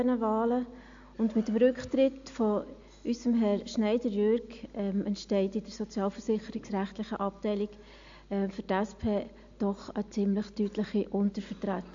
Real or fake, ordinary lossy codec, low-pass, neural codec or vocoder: real; AAC, 64 kbps; 7.2 kHz; none